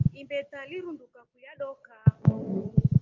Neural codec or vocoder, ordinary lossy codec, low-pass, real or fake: none; Opus, 24 kbps; 7.2 kHz; real